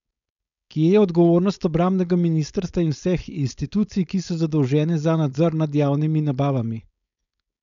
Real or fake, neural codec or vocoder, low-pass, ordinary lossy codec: fake; codec, 16 kHz, 4.8 kbps, FACodec; 7.2 kHz; none